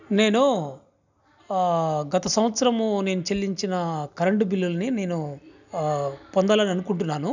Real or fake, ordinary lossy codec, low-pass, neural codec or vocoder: real; none; 7.2 kHz; none